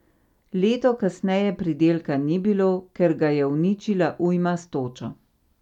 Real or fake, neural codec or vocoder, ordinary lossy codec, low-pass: real; none; none; 19.8 kHz